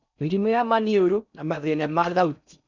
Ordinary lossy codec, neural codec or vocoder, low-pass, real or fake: none; codec, 16 kHz in and 24 kHz out, 0.6 kbps, FocalCodec, streaming, 4096 codes; 7.2 kHz; fake